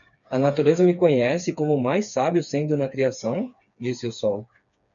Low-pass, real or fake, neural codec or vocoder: 7.2 kHz; fake; codec, 16 kHz, 4 kbps, FreqCodec, smaller model